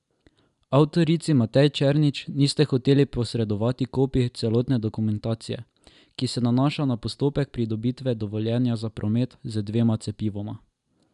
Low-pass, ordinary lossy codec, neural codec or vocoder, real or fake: 10.8 kHz; none; none; real